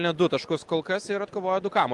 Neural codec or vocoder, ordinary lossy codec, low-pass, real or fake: none; Opus, 24 kbps; 10.8 kHz; real